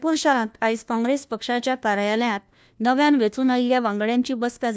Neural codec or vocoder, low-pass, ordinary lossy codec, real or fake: codec, 16 kHz, 1 kbps, FunCodec, trained on LibriTTS, 50 frames a second; none; none; fake